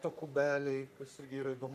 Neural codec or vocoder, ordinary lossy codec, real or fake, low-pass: codec, 44.1 kHz, 3.4 kbps, Pupu-Codec; AAC, 96 kbps; fake; 14.4 kHz